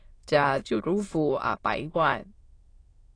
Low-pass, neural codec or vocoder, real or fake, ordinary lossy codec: 9.9 kHz; autoencoder, 22.05 kHz, a latent of 192 numbers a frame, VITS, trained on many speakers; fake; AAC, 32 kbps